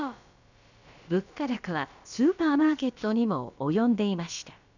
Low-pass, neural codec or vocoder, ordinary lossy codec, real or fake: 7.2 kHz; codec, 16 kHz, about 1 kbps, DyCAST, with the encoder's durations; none; fake